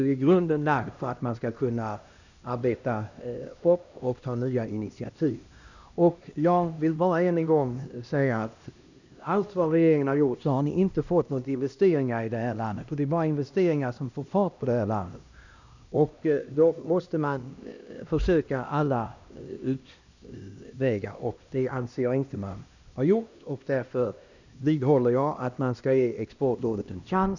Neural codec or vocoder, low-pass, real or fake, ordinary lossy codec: codec, 16 kHz, 1 kbps, X-Codec, HuBERT features, trained on LibriSpeech; 7.2 kHz; fake; none